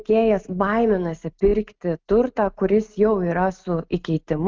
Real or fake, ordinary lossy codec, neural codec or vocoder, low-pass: real; Opus, 32 kbps; none; 7.2 kHz